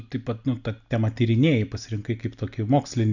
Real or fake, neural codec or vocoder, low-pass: real; none; 7.2 kHz